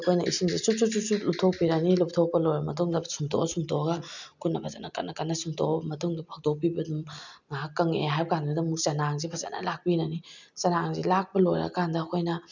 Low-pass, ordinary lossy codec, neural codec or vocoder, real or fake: 7.2 kHz; none; none; real